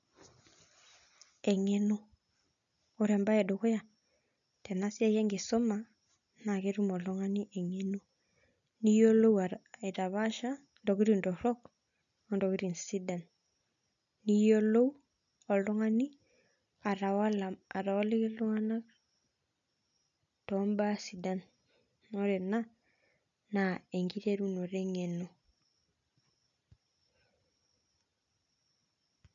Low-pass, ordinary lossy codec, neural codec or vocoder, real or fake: 7.2 kHz; none; none; real